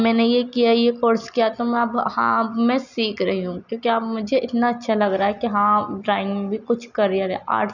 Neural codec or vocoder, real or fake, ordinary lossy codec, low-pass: none; real; none; 7.2 kHz